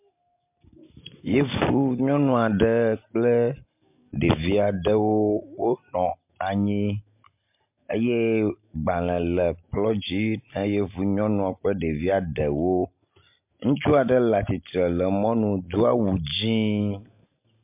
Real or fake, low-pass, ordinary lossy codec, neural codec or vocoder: real; 3.6 kHz; MP3, 32 kbps; none